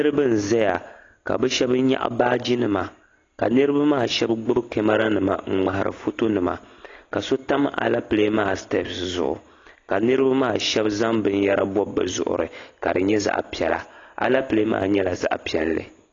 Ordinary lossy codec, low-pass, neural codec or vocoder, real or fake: AAC, 32 kbps; 7.2 kHz; none; real